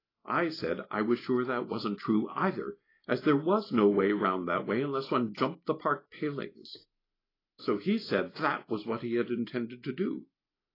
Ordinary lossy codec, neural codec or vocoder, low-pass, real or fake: AAC, 24 kbps; none; 5.4 kHz; real